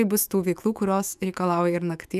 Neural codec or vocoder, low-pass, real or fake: autoencoder, 48 kHz, 128 numbers a frame, DAC-VAE, trained on Japanese speech; 14.4 kHz; fake